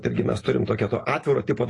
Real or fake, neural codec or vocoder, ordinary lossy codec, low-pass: real; none; AAC, 32 kbps; 10.8 kHz